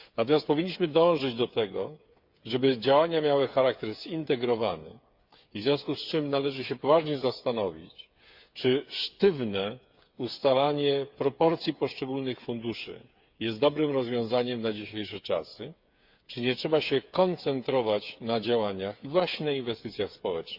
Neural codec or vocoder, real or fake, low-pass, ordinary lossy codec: codec, 16 kHz, 8 kbps, FreqCodec, smaller model; fake; 5.4 kHz; Opus, 64 kbps